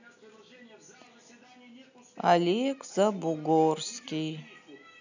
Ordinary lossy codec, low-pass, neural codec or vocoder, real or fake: none; 7.2 kHz; none; real